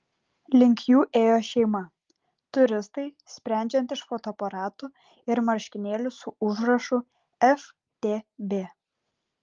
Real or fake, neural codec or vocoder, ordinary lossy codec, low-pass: real; none; Opus, 32 kbps; 7.2 kHz